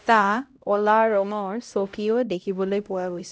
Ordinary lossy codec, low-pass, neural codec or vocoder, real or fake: none; none; codec, 16 kHz, 0.5 kbps, X-Codec, HuBERT features, trained on LibriSpeech; fake